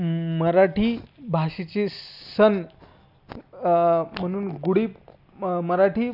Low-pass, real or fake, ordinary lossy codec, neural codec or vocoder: 5.4 kHz; real; none; none